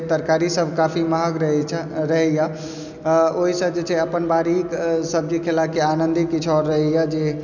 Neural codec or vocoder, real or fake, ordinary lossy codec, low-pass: none; real; none; 7.2 kHz